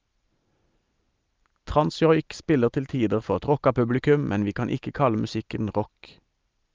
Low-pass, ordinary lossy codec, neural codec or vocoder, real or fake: 7.2 kHz; Opus, 24 kbps; none; real